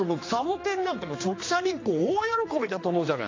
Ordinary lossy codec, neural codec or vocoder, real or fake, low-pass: AAC, 32 kbps; codec, 16 kHz, 2 kbps, X-Codec, HuBERT features, trained on general audio; fake; 7.2 kHz